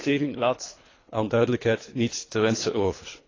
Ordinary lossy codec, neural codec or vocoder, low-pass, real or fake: AAC, 32 kbps; codec, 24 kHz, 3 kbps, HILCodec; 7.2 kHz; fake